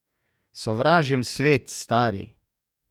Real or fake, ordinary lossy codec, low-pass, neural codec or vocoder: fake; none; 19.8 kHz; codec, 44.1 kHz, 2.6 kbps, DAC